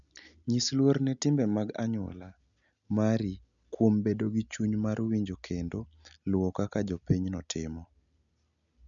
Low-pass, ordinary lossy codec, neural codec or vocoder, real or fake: 7.2 kHz; none; none; real